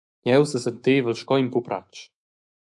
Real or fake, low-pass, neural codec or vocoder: fake; 10.8 kHz; autoencoder, 48 kHz, 128 numbers a frame, DAC-VAE, trained on Japanese speech